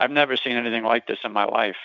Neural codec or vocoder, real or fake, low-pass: vocoder, 44.1 kHz, 128 mel bands every 512 samples, BigVGAN v2; fake; 7.2 kHz